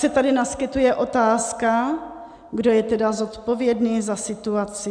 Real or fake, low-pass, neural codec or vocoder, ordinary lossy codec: real; 9.9 kHz; none; MP3, 96 kbps